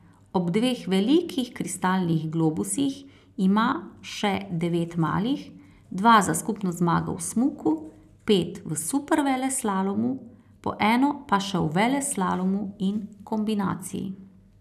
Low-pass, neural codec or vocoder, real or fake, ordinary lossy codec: 14.4 kHz; none; real; none